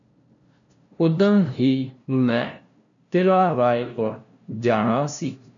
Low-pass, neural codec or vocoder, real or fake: 7.2 kHz; codec, 16 kHz, 0.5 kbps, FunCodec, trained on LibriTTS, 25 frames a second; fake